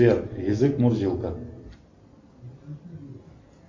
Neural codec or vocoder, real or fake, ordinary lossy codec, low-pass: none; real; MP3, 48 kbps; 7.2 kHz